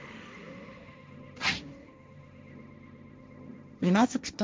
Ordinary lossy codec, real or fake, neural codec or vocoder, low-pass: none; fake; codec, 16 kHz, 1.1 kbps, Voila-Tokenizer; none